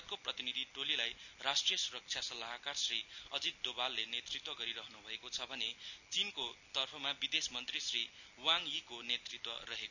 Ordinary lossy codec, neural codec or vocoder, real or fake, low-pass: none; none; real; 7.2 kHz